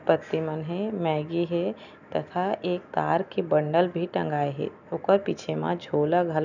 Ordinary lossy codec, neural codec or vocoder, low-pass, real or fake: none; none; 7.2 kHz; real